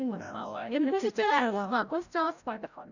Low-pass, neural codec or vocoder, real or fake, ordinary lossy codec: 7.2 kHz; codec, 16 kHz, 0.5 kbps, FreqCodec, larger model; fake; none